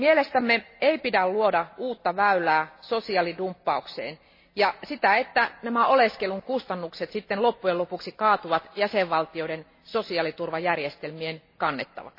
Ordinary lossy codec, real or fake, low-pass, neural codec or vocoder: MP3, 24 kbps; real; 5.4 kHz; none